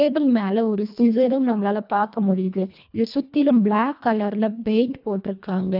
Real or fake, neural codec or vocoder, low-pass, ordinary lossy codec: fake; codec, 24 kHz, 1.5 kbps, HILCodec; 5.4 kHz; none